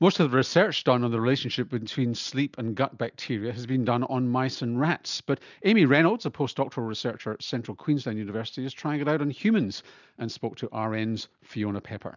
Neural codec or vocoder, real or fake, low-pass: none; real; 7.2 kHz